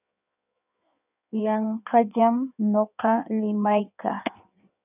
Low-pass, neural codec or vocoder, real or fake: 3.6 kHz; codec, 16 kHz in and 24 kHz out, 1.1 kbps, FireRedTTS-2 codec; fake